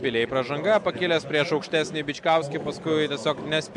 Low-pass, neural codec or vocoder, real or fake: 10.8 kHz; none; real